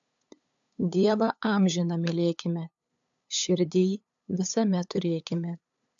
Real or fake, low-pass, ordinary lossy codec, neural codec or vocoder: fake; 7.2 kHz; AAC, 64 kbps; codec, 16 kHz, 8 kbps, FunCodec, trained on LibriTTS, 25 frames a second